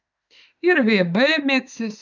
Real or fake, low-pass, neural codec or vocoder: fake; 7.2 kHz; autoencoder, 48 kHz, 32 numbers a frame, DAC-VAE, trained on Japanese speech